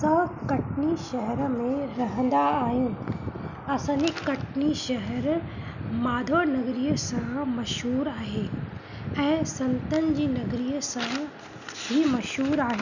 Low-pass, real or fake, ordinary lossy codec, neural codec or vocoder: 7.2 kHz; real; none; none